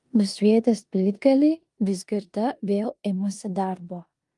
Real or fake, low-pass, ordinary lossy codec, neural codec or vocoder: fake; 10.8 kHz; Opus, 32 kbps; codec, 16 kHz in and 24 kHz out, 0.9 kbps, LongCat-Audio-Codec, four codebook decoder